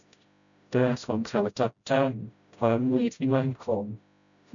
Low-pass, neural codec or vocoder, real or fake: 7.2 kHz; codec, 16 kHz, 0.5 kbps, FreqCodec, smaller model; fake